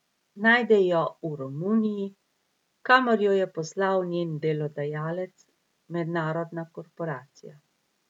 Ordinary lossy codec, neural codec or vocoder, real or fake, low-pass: none; none; real; 19.8 kHz